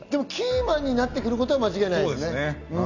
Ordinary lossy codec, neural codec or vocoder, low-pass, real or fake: none; none; 7.2 kHz; real